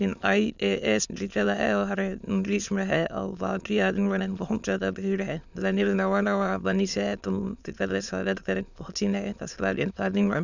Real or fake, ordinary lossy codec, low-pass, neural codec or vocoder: fake; none; 7.2 kHz; autoencoder, 22.05 kHz, a latent of 192 numbers a frame, VITS, trained on many speakers